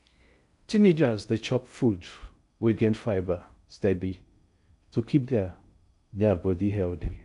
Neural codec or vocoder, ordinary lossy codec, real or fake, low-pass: codec, 16 kHz in and 24 kHz out, 0.6 kbps, FocalCodec, streaming, 4096 codes; none; fake; 10.8 kHz